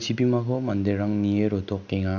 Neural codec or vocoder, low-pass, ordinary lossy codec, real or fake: none; 7.2 kHz; none; real